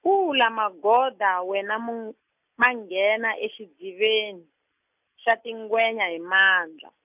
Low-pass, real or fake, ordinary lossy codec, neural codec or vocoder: 3.6 kHz; real; none; none